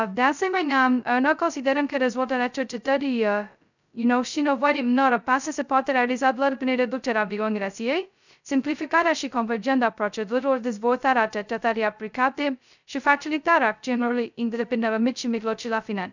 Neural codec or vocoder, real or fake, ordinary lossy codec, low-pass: codec, 16 kHz, 0.2 kbps, FocalCodec; fake; none; 7.2 kHz